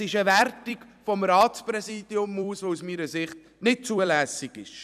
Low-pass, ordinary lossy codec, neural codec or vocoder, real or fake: 14.4 kHz; none; vocoder, 44.1 kHz, 128 mel bands every 256 samples, BigVGAN v2; fake